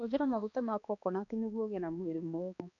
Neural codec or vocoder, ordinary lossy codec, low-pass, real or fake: codec, 16 kHz, 2 kbps, X-Codec, HuBERT features, trained on balanced general audio; none; 7.2 kHz; fake